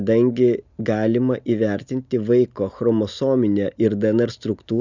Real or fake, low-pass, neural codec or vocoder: real; 7.2 kHz; none